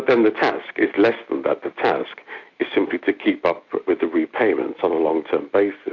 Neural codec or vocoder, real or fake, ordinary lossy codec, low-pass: none; real; AAC, 48 kbps; 7.2 kHz